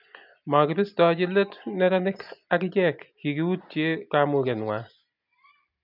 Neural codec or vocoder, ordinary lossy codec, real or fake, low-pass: none; none; real; 5.4 kHz